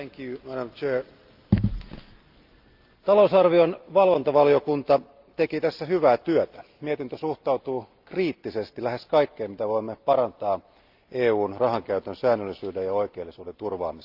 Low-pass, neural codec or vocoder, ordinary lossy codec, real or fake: 5.4 kHz; none; Opus, 24 kbps; real